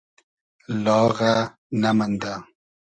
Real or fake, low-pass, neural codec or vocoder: real; 9.9 kHz; none